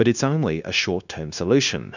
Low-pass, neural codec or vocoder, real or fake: 7.2 kHz; codec, 16 kHz, 0.9 kbps, LongCat-Audio-Codec; fake